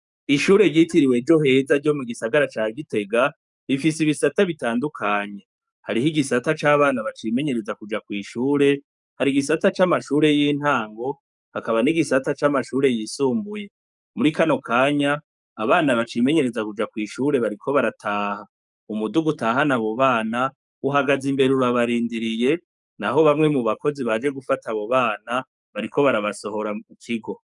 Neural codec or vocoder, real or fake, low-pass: codec, 44.1 kHz, 7.8 kbps, DAC; fake; 10.8 kHz